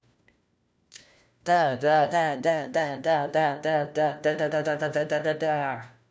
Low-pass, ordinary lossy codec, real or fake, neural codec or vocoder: none; none; fake; codec, 16 kHz, 1 kbps, FunCodec, trained on LibriTTS, 50 frames a second